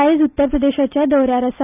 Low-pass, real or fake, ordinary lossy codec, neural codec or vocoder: 3.6 kHz; real; none; none